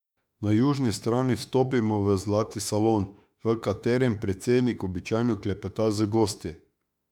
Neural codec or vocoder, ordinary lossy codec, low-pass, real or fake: autoencoder, 48 kHz, 32 numbers a frame, DAC-VAE, trained on Japanese speech; none; 19.8 kHz; fake